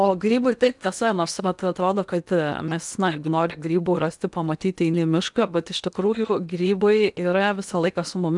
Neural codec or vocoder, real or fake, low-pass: codec, 16 kHz in and 24 kHz out, 0.8 kbps, FocalCodec, streaming, 65536 codes; fake; 10.8 kHz